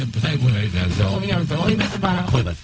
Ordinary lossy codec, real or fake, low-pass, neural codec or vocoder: none; fake; none; codec, 16 kHz, 0.4 kbps, LongCat-Audio-Codec